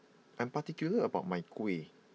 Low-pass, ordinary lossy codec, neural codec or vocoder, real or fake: none; none; none; real